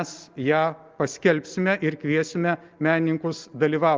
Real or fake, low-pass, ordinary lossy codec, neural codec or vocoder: real; 7.2 kHz; Opus, 24 kbps; none